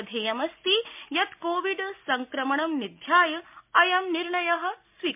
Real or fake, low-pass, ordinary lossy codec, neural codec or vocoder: real; 3.6 kHz; MP3, 32 kbps; none